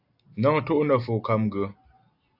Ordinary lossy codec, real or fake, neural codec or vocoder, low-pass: AAC, 48 kbps; real; none; 5.4 kHz